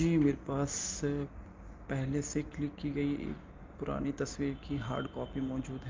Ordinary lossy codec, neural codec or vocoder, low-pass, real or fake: Opus, 32 kbps; none; 7.2 kHz; real